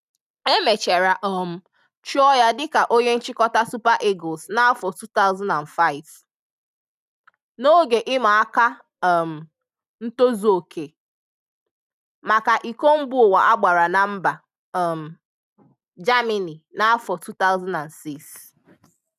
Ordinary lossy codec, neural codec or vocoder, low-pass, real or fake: none; none; 14.4 kHz; real